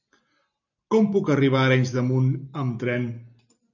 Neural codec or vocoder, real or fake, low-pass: none; real; 7.2 kHz